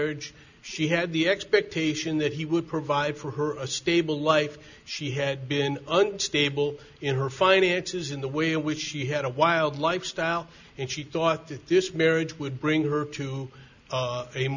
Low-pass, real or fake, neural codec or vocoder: 7.2 kHz; real; none